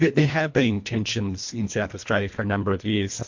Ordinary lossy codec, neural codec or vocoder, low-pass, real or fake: MP3, 48 kbps; codec, 24 kHz, 1.5 kbps, HILCodec; 7.2 kHz; fake